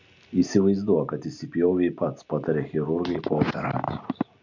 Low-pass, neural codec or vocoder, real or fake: 7.2 kHz; none; real